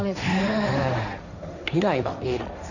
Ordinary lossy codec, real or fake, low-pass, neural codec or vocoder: none; fake; 7.2 kHz; codec, 16 kHz, 1.1 kbps, Voila-Tokenizer